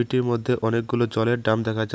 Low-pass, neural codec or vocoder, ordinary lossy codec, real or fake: none; none; none; real